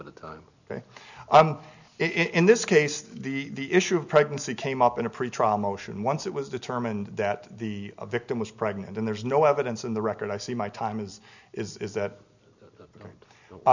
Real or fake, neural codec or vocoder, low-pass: real; none; 7.2 kHz